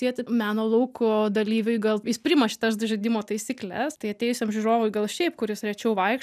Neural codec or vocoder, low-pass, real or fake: none; 14.4 kHz; real